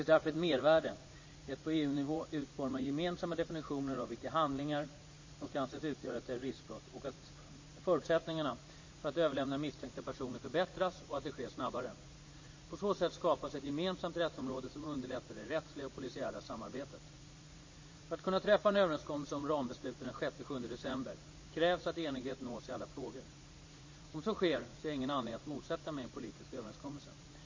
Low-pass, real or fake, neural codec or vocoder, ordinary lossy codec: 7.2 kHz; fake; vocoder, 44.1 kHz, 80 mel bands, Vocos; MP3, 32 kbps